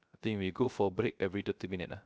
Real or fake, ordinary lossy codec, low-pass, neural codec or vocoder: fake; none; none; codec, 16 kHz, 0.3 kbps, FocalCodec